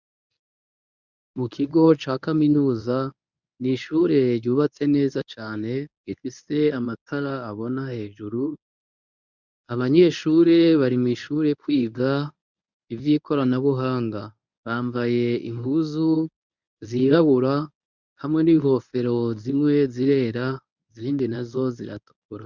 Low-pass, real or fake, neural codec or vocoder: 7.2 kHz; fake; codec, 24 kHz, 0.9 kbps, WavTokenizer, medium speech release version 1